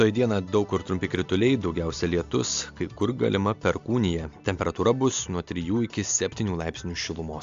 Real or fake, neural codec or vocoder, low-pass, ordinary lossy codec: real; none; 7.2 kHz; AAC, 64 kbps